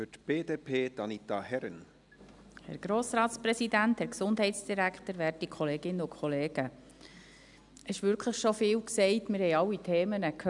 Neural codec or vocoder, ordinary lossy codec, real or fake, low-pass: none; none; real; 10.8 kHz